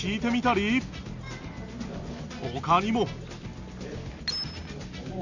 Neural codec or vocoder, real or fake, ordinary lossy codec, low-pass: none; real; none; 7.2 kHz